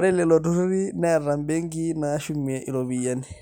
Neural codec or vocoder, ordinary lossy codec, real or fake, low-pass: none; none; real; none